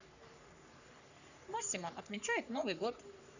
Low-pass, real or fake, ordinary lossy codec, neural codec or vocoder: 7.2 kHz; fake; none; codec, 44.1 kHz, 3.4 kbps, Pupu-Codec